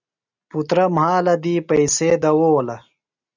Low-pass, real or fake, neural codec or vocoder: 7.2 kHz; real; none